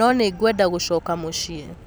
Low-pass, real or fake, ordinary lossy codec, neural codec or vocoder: none; real; none; none